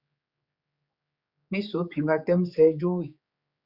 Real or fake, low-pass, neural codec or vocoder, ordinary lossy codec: fake; 5.4 kHz; codec, 16 kHz, 4 kbps, X-Codec, HuBERT features, trained on general audio; Opus, 64 kbps